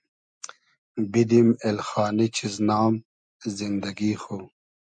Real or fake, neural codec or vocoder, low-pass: real; none; 9.9 kHz